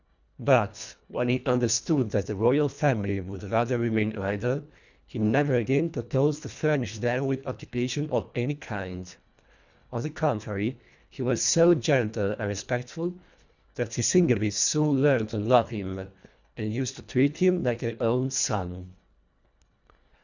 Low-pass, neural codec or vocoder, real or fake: 7.2 kHz; codec, 24 kHz, 1.5 kbps, HILCodec; fake